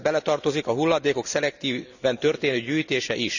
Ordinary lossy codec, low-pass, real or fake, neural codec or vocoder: none; 7.2 kHz; real; none